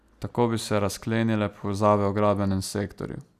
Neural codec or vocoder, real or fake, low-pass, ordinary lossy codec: none; real; 14.4 kHz; none